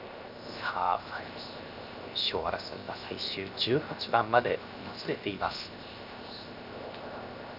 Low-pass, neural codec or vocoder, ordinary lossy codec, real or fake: 5.4 kHz; codec, 16 kHz, 0.7 kbps, FocalCodec; none; fake